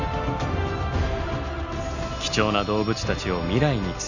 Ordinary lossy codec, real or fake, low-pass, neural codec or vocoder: none; real; 7.2 kHz; none